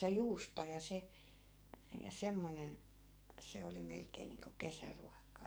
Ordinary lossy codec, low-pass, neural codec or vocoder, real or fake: none; none; codec, 44.1 kHz, 2.6 kbps, SNAC; fake